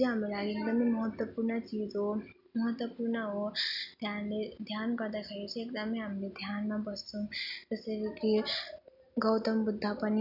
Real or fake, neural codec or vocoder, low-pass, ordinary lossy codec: real; none; 5.4 kHz; none